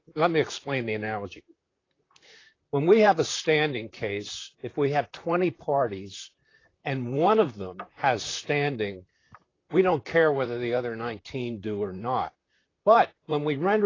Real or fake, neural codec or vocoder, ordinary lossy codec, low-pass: fake; vocoder, 44.1 kHz, 128 mel bands, Pupu-Vocoder; AAC, 32 kbps; 7.2 kHz